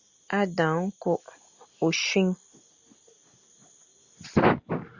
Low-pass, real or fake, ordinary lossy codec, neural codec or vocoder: 7.2 kHz; real; Opus, 64 kbps; none